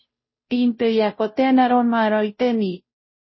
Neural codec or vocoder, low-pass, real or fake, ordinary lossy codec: codec, 16 kHz, 0.5 kbps, FunCodec, trained on Chinese and English, 25 frames a second; 7.2 kHz; fake; MP3, 24 kbps